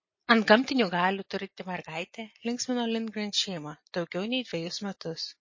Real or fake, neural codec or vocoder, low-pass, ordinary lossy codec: real; none; 7.2 kHz; MP3, 32 kbps